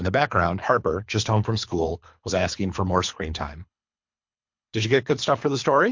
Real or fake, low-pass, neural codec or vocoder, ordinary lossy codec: fake; 7.2 kHz; codec, 24 kHz, 3 kbps, HILCodec; MP3, 48 kbps